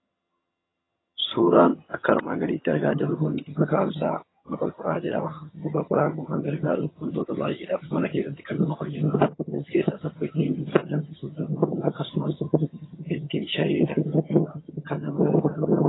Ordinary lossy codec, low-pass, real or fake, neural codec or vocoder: AAC, 16 kbps; 7.2 kHz; fake; vocoder, 22.05 kHz, 80 mel bands, HiFi-GAN